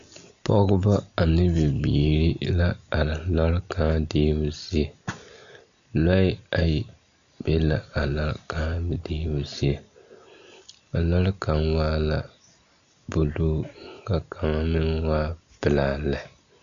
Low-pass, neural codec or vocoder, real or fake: 7.2 kHz; none; real